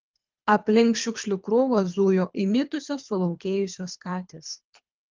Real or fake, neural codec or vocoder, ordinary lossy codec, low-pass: fake; codec, 24 kHz, 3 kbps, HILCodec; Opus, 32 kbps; 7.2 kHz